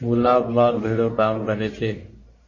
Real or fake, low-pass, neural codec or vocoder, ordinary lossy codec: fake; 7.2 kHz; codec, 44.1 kHz, 1.7 kbps, Pupu-Codec; MP3, 32 kbps